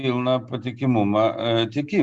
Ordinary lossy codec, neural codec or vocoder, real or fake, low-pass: Opus, 64 kbps; none; real; 10.8 kHz